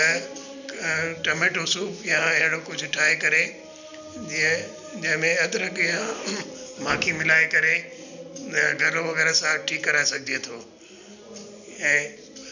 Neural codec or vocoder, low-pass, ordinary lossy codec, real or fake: none; 7.2 kHz; none; real